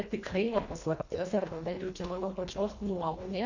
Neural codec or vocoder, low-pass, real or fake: codec, 24 kHz, 1.5 kbps, HILCodec; 7.2 kHz; fake